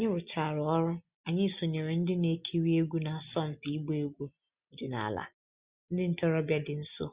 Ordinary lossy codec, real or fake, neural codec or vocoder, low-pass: Opus, 64 kbps; real; none; 3.6 kHz